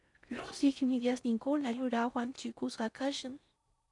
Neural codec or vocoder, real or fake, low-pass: codec, 16 kHz in and 24 kHz out, 0.6 kbps, FocalCodec, streaming, 4096 codes; fake; 10.8 kHz